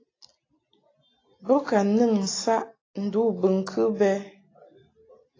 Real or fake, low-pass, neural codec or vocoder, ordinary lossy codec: real; 7.2 kHz; none; AAC, 32 kbps